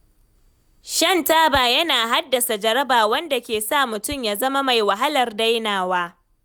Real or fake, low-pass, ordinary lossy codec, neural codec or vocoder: real; none; none; none